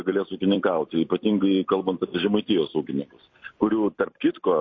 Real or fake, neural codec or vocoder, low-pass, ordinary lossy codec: fake; vocoder, 44.1 kHz, 128 mel bands every 256 samples, BigVGAN v2; 7.2 kHz; MP3, 32 kbps